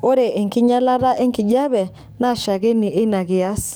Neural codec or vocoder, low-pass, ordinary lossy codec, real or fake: codec, 44.1 kHz, 7.8 kbps, DAC; none; none; fake